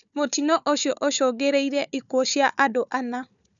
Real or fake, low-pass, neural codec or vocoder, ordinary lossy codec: fake; 7.2 kHz; codec, 16 kHz, 4 kbps, FunCodec, trained on Chinese and English, 50 frames a second; none